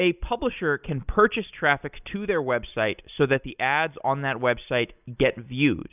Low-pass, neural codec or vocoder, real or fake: 3.6 kHz; none; real